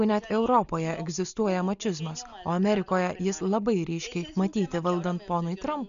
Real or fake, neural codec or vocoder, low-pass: real; none; 7.2 kHz